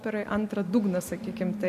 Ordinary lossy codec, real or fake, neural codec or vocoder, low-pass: MP3, 64 kbps; real; none; 14.4 kHz